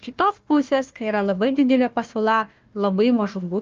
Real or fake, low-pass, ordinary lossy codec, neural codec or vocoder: fake; 7.2 kHz; Opus, 24 kbps; codec, 16 kHz, 1 kbps, FunCodec, trained on Chinese and English, 50 frames a second